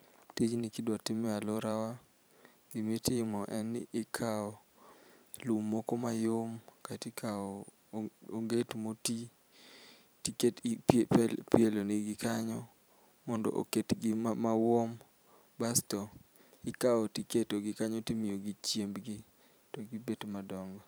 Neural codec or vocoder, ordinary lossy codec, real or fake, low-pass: vocoder, 44.1 kHz, 128 mel bands every 512 samples, BigVGAN v2; none; fake; none